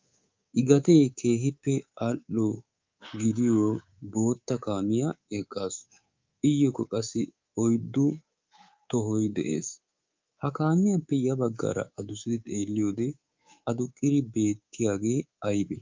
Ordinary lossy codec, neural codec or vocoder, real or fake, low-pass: Opus, 24 kbps; codec, 24 kHz, 3.1 kbps, DualCodec; fake; 7.2 kHz